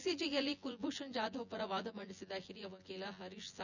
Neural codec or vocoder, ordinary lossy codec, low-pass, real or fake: vocoder, 24 kHz, 100 mel bands, Vocos; none; 7.2 kHz; fake